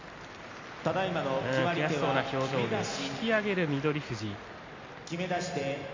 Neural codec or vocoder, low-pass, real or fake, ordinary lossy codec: none; 7.2 kHz; real; MP3, 48 kbps